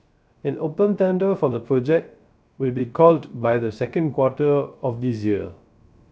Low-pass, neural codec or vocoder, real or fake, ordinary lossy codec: none; codec, 16 kHz, 0.3 kbps, FocalCodec; fake; none